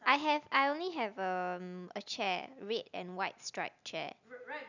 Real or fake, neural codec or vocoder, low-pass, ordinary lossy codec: real; none; 7.2 kHz; none